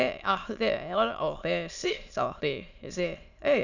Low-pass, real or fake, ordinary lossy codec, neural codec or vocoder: 7.2 kHz; fake; none; autoencoder, 22.05 kHz, a latent of 192 numbers a frame, VITS, trained on many speakers